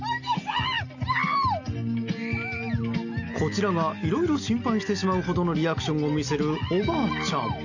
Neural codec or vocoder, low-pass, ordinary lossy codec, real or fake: none; 7.2 kHz; none; real